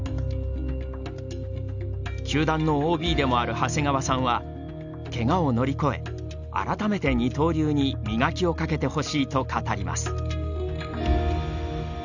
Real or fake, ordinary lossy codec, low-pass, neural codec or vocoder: real; none; 7.2 kHz; none